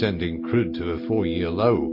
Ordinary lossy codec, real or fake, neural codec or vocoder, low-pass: MP3, 32 kbps; real; none; 5.4 kHz